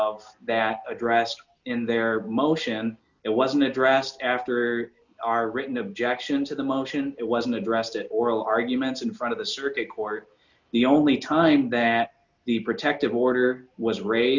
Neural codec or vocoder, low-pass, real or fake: none; 7.2 kHz; real